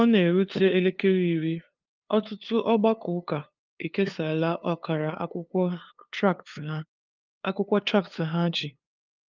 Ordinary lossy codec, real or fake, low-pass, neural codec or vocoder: Opus, 24 kbps; fake; 7.2 kHz; codec, 16 kHz, 2 kbps, FunCodec, trained on LibriTTS, 25 frames a second